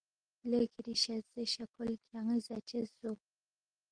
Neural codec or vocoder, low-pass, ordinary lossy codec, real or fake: none; 9.9 kHz; Opus, 16 kbps; real